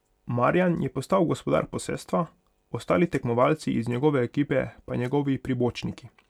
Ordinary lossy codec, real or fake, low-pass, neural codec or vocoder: none; real; 19.8 kHz; none